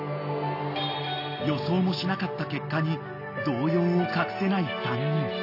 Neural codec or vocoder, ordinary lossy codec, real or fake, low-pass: none; AAC, 32 kbps; real; 5.4 kHz